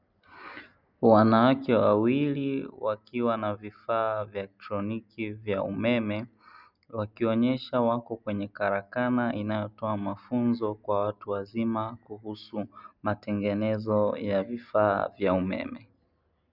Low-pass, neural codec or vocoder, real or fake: 5.4 kHz; none; real